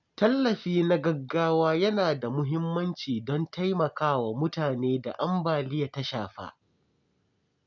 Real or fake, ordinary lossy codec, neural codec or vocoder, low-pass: real; none; none; 7.2 kHz